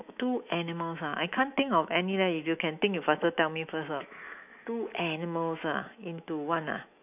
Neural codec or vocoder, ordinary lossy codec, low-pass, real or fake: autoencoder, 48 kHz, 128 numbers a frame, DAC-VAE, trained on Japanese speech; none; 3.6 kHz; fake